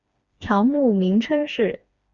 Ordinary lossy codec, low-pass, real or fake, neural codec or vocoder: Opus, 64 kbps; 7.2 kHz; fake; codec, 16 kHz, 2 kbps, FreqCodec, smaller model